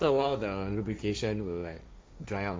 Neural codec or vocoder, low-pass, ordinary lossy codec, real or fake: codec, 16 kHz, 1.1 kbps, Voila-Tokenizer; none; none; fake